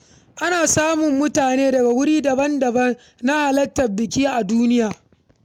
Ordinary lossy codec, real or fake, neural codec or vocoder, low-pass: MP3, 96 kbps; real; none; 19.8 kHz